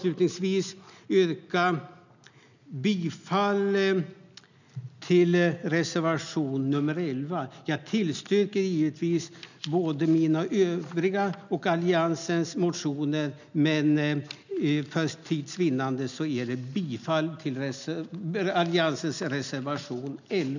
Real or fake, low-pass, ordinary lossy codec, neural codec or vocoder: real; 7.2 kHz; none; none